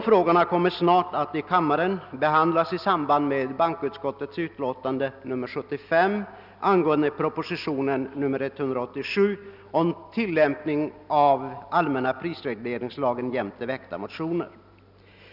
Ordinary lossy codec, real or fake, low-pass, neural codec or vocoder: none; real; 5.4 kHz; none